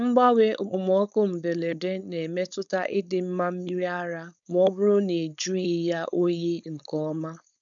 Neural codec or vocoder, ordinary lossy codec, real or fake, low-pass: codec, 16 kHz, 4.8 kbps, FACodec; none; fake; 7.2 kHz